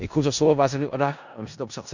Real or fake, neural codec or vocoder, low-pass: fake; codec, 16 kHz in and 24 kHz out, 0.4 kbps, LongCat-Audio-Codec, four codebook decoder; 7.2 kHz